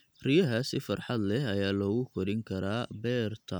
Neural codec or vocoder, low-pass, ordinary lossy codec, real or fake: none; none; none; real